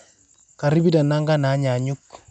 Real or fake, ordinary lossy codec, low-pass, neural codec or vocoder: real; none; 9.9 kHz; none